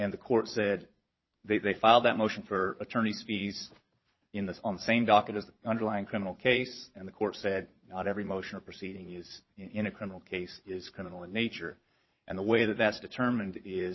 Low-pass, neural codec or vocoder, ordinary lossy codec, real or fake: 7.2 kHz; vocoder, 22.05 kHz, 80 mel bands, Vocos; MP3, 24 kbps; fake